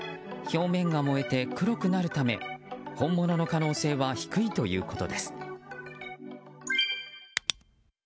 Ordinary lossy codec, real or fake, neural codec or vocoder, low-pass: none; real; none; none